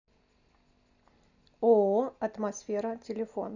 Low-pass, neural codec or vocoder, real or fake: 7.2 kHz; none; real